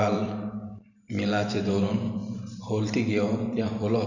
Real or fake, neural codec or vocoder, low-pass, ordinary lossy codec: fake; vocoder, 44.1 kHz, 128 mel bands every 512 samples, BigVGAN v2; 7.2 kHz; none